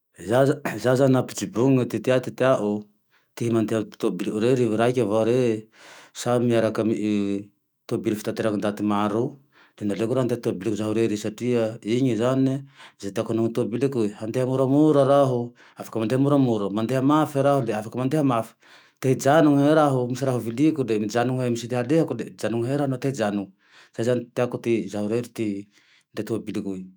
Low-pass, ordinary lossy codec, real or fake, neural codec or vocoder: none; none; fake; autoencoder, 48 kHz, 128 numbers a frame, DAC-VAE, trained on Japanese speech